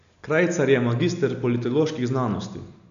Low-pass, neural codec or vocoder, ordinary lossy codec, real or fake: 7.2 kHz; none; none; real